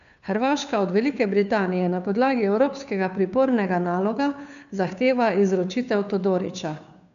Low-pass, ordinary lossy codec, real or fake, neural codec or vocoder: 7.2 kHz; none; fake; codec, 16 kHz, 2 kbps, FunCodec, trained on Chinese and English, 25 frames a second